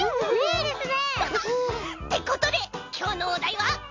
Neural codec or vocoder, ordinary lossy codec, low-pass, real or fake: none; MP3, 48 kbps; 7.2 kHz; real